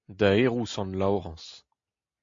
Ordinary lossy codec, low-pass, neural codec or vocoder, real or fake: MP3, 48 kbps; 7.2 kHz; none; real